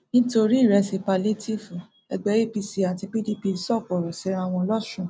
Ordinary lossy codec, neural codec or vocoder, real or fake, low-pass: none; none; real; none